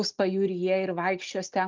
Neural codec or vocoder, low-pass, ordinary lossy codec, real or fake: none; 7.2 kHz; Opus, 32 kbps; real